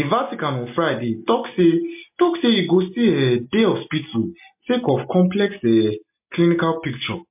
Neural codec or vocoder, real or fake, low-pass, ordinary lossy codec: none; real; 3.6 kHz; MP3, 32 kbps